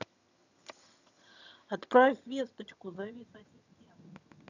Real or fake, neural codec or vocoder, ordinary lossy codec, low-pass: fake; vocoder, 22.05 kHz, 80 mel bands, HiFi-GAN; none; 7.2 kHz